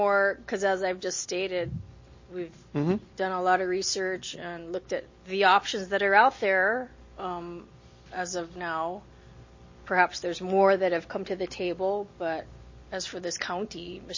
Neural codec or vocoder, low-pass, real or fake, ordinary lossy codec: none; 7.2 kHz; real; MP3, 32 kbps